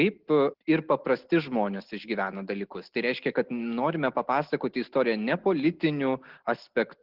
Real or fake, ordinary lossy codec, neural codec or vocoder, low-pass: real; Opus, 16 kbps; none; 5.4 kHz